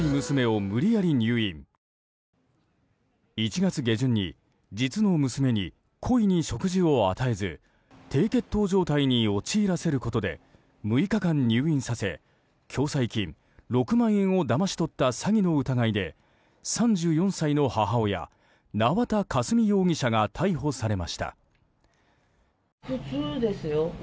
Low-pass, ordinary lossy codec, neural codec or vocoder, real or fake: none; none; none; real